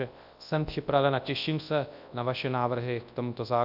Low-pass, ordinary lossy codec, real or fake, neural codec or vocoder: 5.4 kHz; AAC, 48 kbps; fake; codec, 24 kHz, 0.9 kbps, WavTokenizer, large speech release